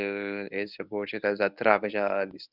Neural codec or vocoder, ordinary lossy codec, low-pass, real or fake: codec, 24 kHz, 0.9 kbps, WavTokenizer, medium speech release version 1; none; 5.4 kHz; fake